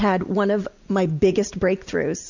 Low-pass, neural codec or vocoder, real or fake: 7.2 kHz; none; real